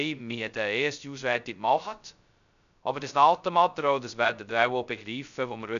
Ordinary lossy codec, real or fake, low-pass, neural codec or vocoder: none; fake; 7.2 kHz; codec, 16 kHz, 0.2 kbps, FocalCodec